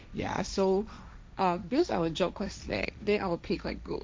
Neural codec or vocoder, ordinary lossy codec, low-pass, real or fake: codec, 16 kHz, 1.1 kbps, Voila-Tokenizer; none; none; fake